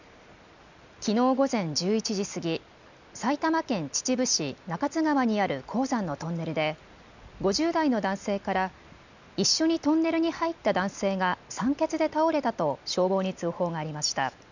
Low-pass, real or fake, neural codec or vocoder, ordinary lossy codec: 7.2 kHz; real; none; none